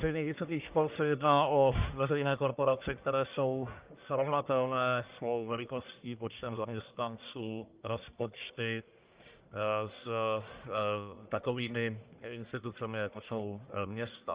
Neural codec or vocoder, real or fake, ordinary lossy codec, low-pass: codec, 44.1 kHz, 1.7 kbps, Pupu-Codec; fake; Opus, 24 kbps; 3.6 kHz